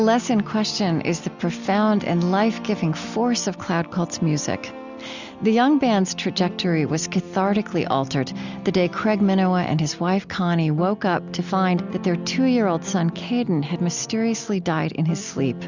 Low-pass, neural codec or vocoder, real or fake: 7.2 kHz; none; real